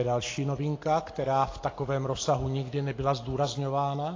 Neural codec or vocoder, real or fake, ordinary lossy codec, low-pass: none; real; AAC, 32 kbps; 7.2 kHz